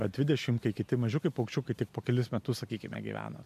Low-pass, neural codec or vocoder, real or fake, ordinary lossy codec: 14.4 kHz; none; real; MP3, 64 kbps